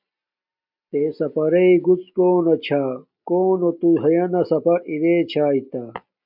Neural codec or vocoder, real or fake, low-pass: none; real; 5.4 kHz